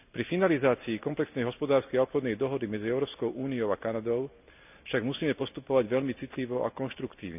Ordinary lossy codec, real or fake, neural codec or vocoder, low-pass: none; real; none; 3.6 kHz